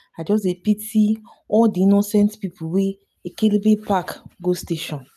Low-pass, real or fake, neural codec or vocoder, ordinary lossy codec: 14.4 kHz; real; none; none